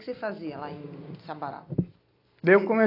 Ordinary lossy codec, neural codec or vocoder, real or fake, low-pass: AAC, 32 kbps; none; real; 5.4 kHz